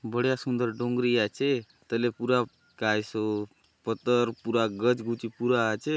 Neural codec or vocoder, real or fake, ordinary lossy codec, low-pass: none; real; none; none